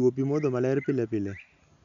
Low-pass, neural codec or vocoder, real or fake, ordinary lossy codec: 7.2 kHz; none; real; none